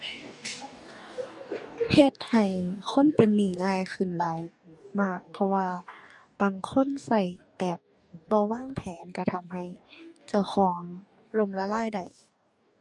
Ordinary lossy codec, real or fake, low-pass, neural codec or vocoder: none; fake; 10.8 kHz; codec, 44.1 kHz, 2.6 kbps, DAC